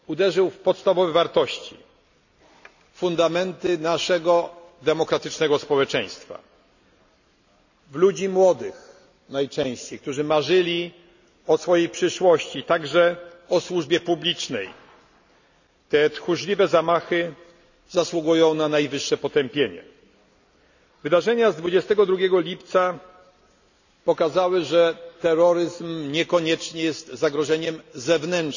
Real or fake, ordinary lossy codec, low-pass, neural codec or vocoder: real; none; 7.2 kHz; none